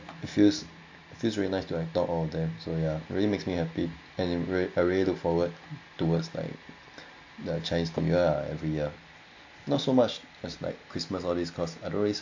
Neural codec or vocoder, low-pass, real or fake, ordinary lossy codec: none; 7.2 kHz; real; AAC, 48 kbps